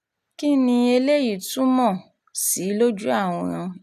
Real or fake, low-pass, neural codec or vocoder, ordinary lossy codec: real; 14.4 kHz; none; none